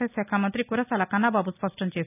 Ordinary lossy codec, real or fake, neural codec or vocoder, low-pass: none; real; none; 3.6 kHz